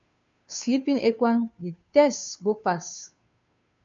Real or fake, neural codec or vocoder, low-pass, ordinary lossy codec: fake; codec, 16 kHz, 2 kbps, FunCodec, trained on Chinese and English, 25 frames a second; 7.2 kHz; AAC, 64 kbps